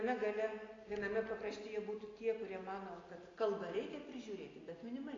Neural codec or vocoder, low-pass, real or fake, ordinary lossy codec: none; 7.2 kHz; real; AAC, 32 kbps